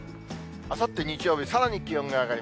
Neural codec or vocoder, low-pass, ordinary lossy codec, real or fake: none; none; none; real